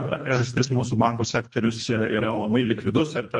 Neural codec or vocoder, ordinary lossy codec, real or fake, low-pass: codec, 24 kHz, 1.5 kbps, HILCodec; MP3, 48 kbps; fake; 10.8 kHz